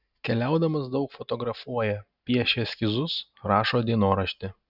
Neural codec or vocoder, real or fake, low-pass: none; real; 5.4 kHz